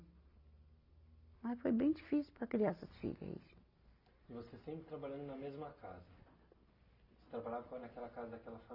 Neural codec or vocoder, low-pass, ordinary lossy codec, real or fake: none; 5.4 kHz; AAC, 48 kbps; real